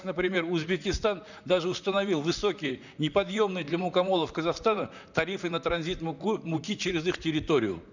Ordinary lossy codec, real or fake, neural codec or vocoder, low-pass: none; fake; vocoder, 44.1 kHz, 128 mel bands, Pupu-Vocoder; 7.2 kHz